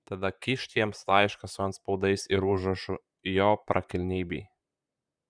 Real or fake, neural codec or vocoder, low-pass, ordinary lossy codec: fake; vocoder, 44.1 kHz, 128 mel bands, Pupu-Vocoder; 9.9 kHz; Opus, 64 kbps